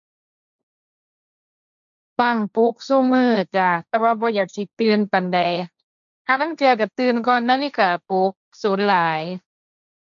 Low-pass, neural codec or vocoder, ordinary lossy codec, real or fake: 7.2 kHz; codec, 16 kHz, 1.1 kbps, Voila-Tokenizer; none; fake